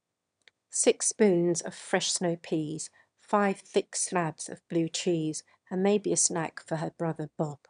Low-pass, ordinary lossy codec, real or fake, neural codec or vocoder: 9.9 kHz; none; fake; autoencoder, 22.05 kHz, a latent of 192 numbers a frame, VITS, trained on one speaker